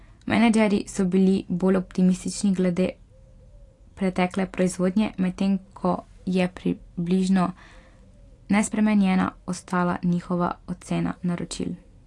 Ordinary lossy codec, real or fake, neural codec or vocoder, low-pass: AAC, 48 kbps; real; none; 10.8 kHz